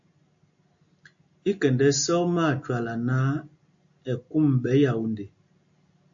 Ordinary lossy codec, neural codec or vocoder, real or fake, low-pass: AAC, 64 kbps; none; real; 7.2 kHz